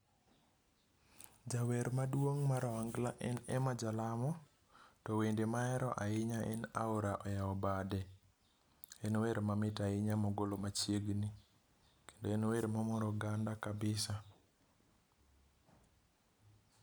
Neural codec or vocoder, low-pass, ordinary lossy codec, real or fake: none; none; none; real